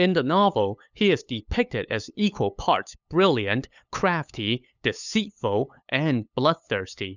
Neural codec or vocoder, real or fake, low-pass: codec, 16 kHz, 8 kbps, FunCodec, trained on LibriTTS, 25 frames a second; fake; 7.2 kHz